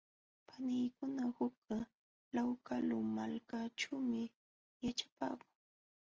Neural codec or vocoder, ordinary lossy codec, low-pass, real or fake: none; Opus, 24 kbps; 7.2 kHz; real